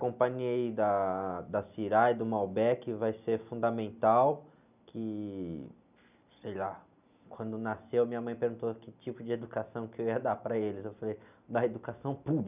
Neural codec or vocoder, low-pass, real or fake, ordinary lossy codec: none; 3.6 kHz; real; none